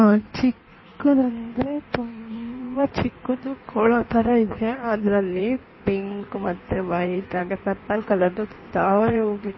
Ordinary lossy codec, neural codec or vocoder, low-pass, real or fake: MP3, 24 kbps; codec, 16 kHz in and 24 kHz out, 1.1 kbps, FireRedTTS-2 codec; 7.2 kHz; fake